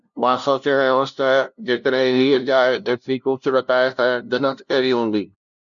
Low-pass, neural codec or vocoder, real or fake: 7.2 kHz; codec, 16 kHz, 0.5 kbps, FunCodec, trained on LibriTTS, 25 frames a second; fake